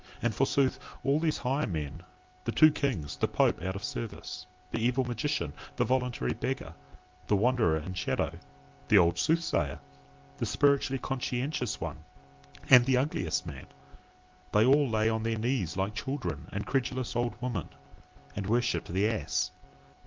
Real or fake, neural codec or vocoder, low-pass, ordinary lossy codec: real; none; 7.2 kHz; Opus, 32 kbps